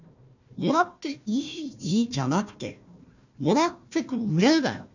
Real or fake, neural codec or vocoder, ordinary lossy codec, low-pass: fake; codec, 16 kHz, 1 kbps, FunCodec, trained on Chinese and English, 50 frames a second; none; 7.2 kHz